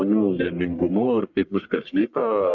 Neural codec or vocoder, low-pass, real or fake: codec, 44.1 kHz, 1.7 kbps, Pupu-Codec; 7.2 kHz; fake